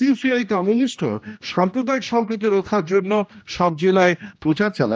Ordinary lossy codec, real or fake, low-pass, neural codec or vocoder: none; fake; none; codec, 16 kHz, 1 kbps, X-Codec, HuBERT features, trained on general audio